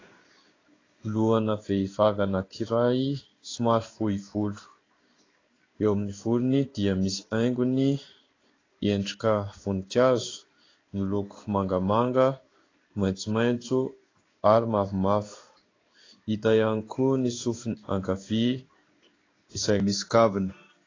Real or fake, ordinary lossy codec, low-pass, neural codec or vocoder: fake; AAC, 32 kbps; 7.2 kHz; codec, 16 kHz in and 24 kHz out, 1 kbps, XY-Tokenizer